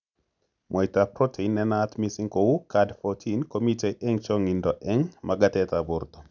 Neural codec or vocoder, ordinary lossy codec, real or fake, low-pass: none; none; real; 7.2 kHz